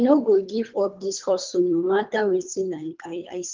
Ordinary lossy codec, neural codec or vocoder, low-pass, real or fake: Opus, 32 kbps; codec, 24 kHz, 3 kbps, HILCodec; 7.2 kHz; fake